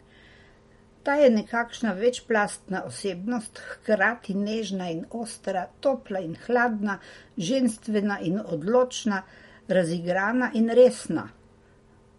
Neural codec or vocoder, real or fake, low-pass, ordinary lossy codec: none; real; 19.8 kHz; MP3, 48 kbps